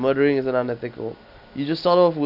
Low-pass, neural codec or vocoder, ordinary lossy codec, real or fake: 5.4 kHz; none; none; real